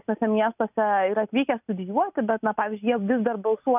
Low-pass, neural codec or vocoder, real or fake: 3.6 kHz; none; real